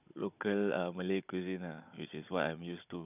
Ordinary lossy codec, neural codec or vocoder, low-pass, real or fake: none; codec, 16 kHz, 16 kbps, FunCodec, trained on Chinese and English, 50 frames a second; 3.6 kHz; fake